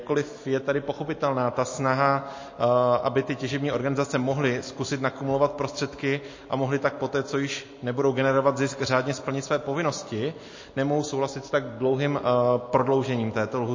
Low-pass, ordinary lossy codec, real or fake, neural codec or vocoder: 7.2 kHz; MP3, 32 kbps; real; none